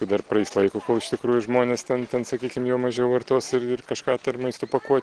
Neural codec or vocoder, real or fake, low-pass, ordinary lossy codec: none; real; 9.9 kHz; Opus, 16 kbps